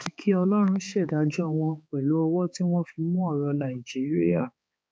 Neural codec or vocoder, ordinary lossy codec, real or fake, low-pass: codec, 16 kHz, 4 kbps, X-Codec, HuBERT features, trained on balanced general audio; none; fake; none